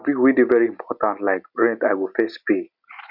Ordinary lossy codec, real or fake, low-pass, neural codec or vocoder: none; real; 5.4 kHz; none